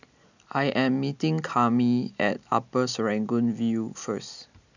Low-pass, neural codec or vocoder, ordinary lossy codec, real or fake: 7.2 kHz; none; none; real